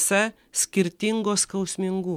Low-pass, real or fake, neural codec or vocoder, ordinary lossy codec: 19.8 kHz; real; none; MP3, 96 kbps